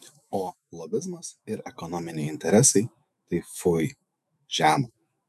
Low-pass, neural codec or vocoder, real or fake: 14.4 kHz; autoencoder, 48 kHz, 128 numbers a frame, DAC-VAE, trained on Japanese speech; fake